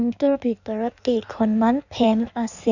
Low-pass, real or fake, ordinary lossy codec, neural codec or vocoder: 7.2 kHz; fake; none; codec, 16 kHz in and 24 kHz out, 1.1 kbps, FireRedTTS-2 codec